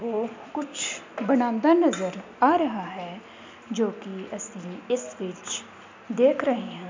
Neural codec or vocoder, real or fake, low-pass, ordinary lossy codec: none; real; 7.2 kHz; MP3, 64 kbps